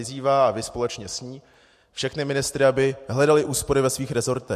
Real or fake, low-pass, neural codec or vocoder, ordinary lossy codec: real; 14.4 kHz; none; MP3, 64 kbps